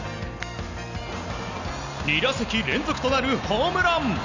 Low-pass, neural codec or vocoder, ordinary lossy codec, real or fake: 7.2 kHz; none; none; real